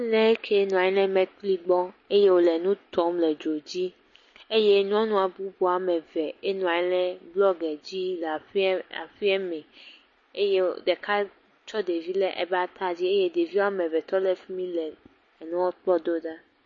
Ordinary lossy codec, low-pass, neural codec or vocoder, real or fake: MP3, 32 kbps; 7.2 kHz; none; real